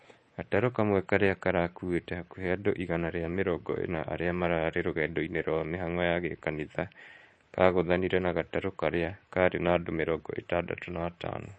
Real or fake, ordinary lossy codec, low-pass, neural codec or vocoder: fake; MP3, 32 kbps; 9.9 kHz; codec, 24 kHz, 3.1 kbps, DualCodec